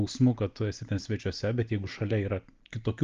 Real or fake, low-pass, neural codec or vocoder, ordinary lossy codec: real; 7.2 kHz; none; Opus, 32 kbps